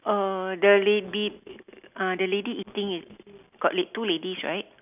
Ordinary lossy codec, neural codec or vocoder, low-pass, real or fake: none; none; 3.6 kHz; real